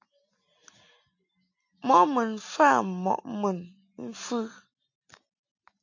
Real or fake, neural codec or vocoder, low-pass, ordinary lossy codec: real; none; 7.2 kHz; AAC, 48 kbps